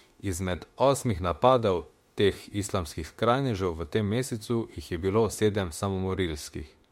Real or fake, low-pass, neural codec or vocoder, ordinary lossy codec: fake; 19.8 kHz; autoencoder, 48 kHz, 32 numbers a frame, DAC-VAE, trained on Japanese speech; MP3, 64 kbps